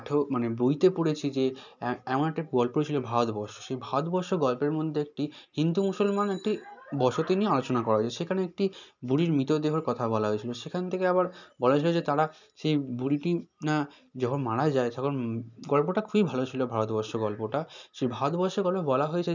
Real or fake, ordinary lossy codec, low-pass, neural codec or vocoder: real; none; 7.2 kHz; none